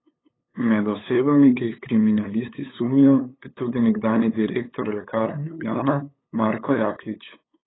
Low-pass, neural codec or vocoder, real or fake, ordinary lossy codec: 7.2 kHz; codec, 16 kHz, 8 kbps, FunCodec, trained on LibriTTS, 25 frames a second; fake; AAC, 16 kbps